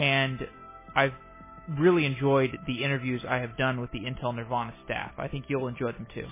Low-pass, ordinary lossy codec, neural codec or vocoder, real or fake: 3.6 kHz; MP3, 16 kbps; none; real